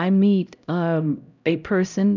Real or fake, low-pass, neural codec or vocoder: fake; 7.2 kHz; codec, 16 kHz, 0.5 kbps, X-Codec, HuBERT features, trained on LibriSpeech